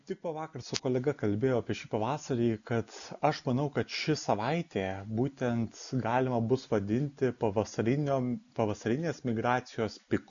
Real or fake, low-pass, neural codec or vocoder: real; 7.2 kHz; none